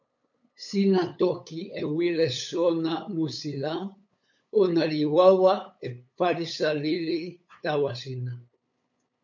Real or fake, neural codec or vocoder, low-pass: fake; codec, 16 kHz, 8 kbps, FunCodec, trained on LibriTTS, 25 frames a second; 7.2 kHz